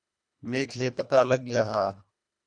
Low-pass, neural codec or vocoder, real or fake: 9.9 kHz; codec, 24 kHz, 1.5 kbps, HILCodec; fake